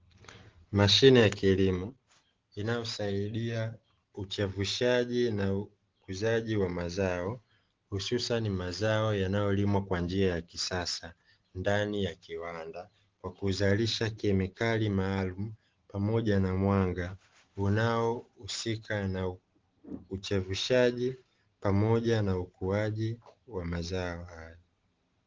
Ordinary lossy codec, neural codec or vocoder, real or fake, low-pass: Opus, 16 kbps; none; real; 7.2 kHz